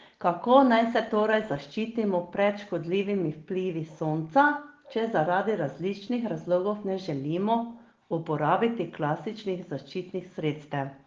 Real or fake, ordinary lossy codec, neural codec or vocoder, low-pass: real; Opus, 16 kbps; none; 7.2 kHz